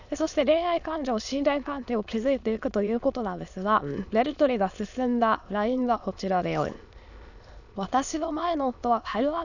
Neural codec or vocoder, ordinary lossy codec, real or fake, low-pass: autoencoder, 22.05 kHz, a latent of 192 numbers a frame, VITS, trained on many speakers; none; fake; 7.2 kHz